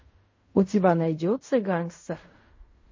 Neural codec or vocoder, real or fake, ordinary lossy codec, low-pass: codec, 16 kHz in and 24 kHz out, 0.4 kbps, LongCat-Audio-Codec, fine tuned four codebook decoder; fake; MP3, 32 kbps; 7.2 kHz